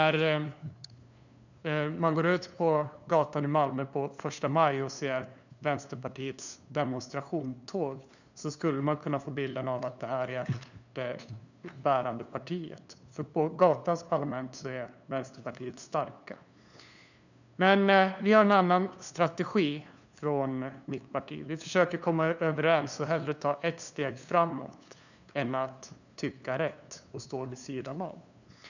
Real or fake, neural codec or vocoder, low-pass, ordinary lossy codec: fake; codec, 16 kHz, 2 kbps, FunCodec, trained on LibriTTS, 25 frames a second; 7.2 kHz; none